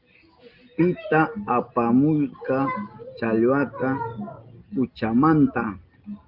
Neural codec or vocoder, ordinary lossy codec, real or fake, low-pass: none; Opus, 32 kbps; real; 5.4 kHz